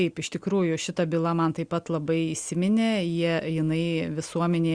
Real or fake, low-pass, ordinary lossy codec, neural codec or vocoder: real; 9.9 kHz; Opus, 64 kbps; none